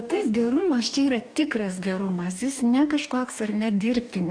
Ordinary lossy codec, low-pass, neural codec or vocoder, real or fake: AAC, 64 kbps; 9.9 kHz; codec, 44.1 kHz, 2.6 kbps, DAC; fake